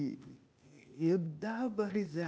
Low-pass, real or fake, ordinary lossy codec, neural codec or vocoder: none; fake; none; codec, 16 kHz, 0.8 kbps, ZipCodec